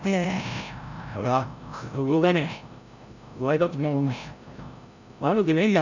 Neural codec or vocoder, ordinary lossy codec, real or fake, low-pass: codec, 16 kHz, 0.5 kbps, FreqCodec, larger model; none; fake; 7.2 kHz